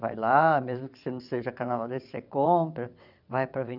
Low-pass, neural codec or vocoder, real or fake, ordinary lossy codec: 5.4 kHz; vocoder, 22.05 kHz, 80 mel bands, WaveNeXt; fake; none